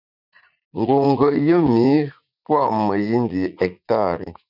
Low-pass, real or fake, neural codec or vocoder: 5.4 kHz; fake; vocoder, 44.1 kHz, 80 mel bands, Vocos